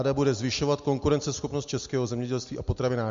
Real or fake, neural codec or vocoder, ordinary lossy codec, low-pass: real; none; MP3, 48 kbps; 7.2 kHz